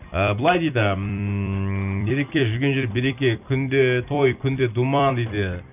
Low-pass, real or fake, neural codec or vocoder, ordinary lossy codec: 3.6 kHz; fake; vocoder, 44.1 kHz, 128 mel bands every 256 samples, BigVGAN v2; none